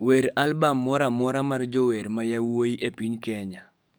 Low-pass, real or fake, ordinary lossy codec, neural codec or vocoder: none; fake; none; codec, 44.1 kHz, 7.8 kbps, DAC